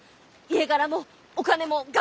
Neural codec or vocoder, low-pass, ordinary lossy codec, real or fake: none; none; none; real